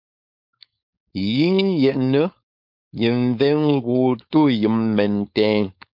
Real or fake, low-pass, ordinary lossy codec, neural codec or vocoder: fake; 5.4 kHz; MP3, 48 kbps; codec, 16 kHz, 4.8 kbps, FACodec